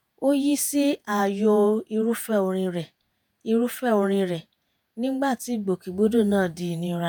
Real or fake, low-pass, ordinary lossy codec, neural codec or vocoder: fake; none; none; vocoder, 48 kHz, 128 mel bands, Vocos